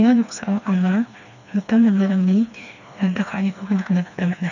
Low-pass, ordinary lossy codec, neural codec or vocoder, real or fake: 7.2 kHz; none; codec, 16 kHz, 2 kbps, FreqCodec, smaller model; fake